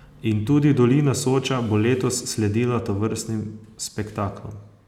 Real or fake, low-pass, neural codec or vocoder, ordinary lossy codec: real; 19.8 kHz; none; none